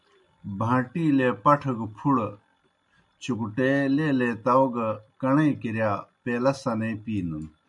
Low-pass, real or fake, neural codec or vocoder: 10.8 kHz; real; none